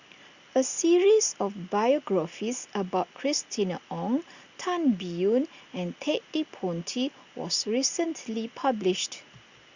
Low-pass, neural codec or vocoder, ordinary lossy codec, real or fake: 7.2 kHz; none; Opus, 64 kbps; real